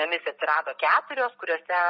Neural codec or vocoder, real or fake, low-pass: none; real; 5.4 kHz